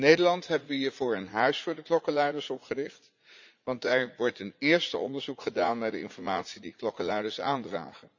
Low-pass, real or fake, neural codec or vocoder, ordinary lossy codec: 7.2 kHz; fake; vocoder, 44.1 kHz, 80 mel bands, Vocos; MP3, 64 kbps